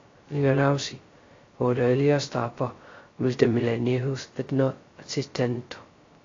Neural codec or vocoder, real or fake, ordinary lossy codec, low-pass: codec, 16 kHz, 0.2 kbps, FocalCodec; fake; AAC, 32 kbps; 7.2 kHz